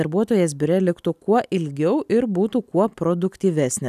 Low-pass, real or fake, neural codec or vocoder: 14.4 kHz; real; none